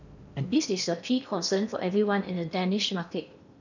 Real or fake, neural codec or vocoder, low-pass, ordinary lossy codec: fake; codec, 16 kHz in and 24 kHz out, 0.6 kbps, FocalCodec, streaming, 4096 codes; 7.2 kHz; none